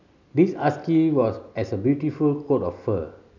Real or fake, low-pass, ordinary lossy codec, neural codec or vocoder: real; 7.2 kHz; none; none